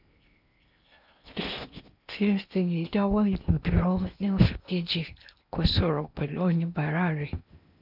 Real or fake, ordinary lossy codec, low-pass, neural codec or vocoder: fake; none; 5.4 kHz; codec, 16 kHz in and 24 kHz out, 0.8 kbps, FocalCodec, streaming, 65536 codes